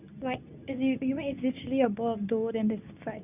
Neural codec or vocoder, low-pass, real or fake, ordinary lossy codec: codec, 24 kHz, 0.9 kbps, WavTokenizer, medium speech release version 2; 3.6 kHz; fake; none